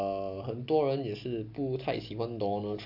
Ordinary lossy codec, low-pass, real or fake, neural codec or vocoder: none; 5.4 kHz; real; none